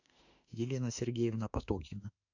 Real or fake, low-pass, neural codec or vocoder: fake; 7.2 kHz; autoencoder, 48 kHz, 32 numbers a frame, DAC-VAE, trained on Japanese speech